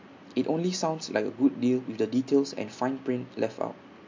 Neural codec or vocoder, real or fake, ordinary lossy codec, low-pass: none; real; MP3, 48 kbps; 7.2 kHz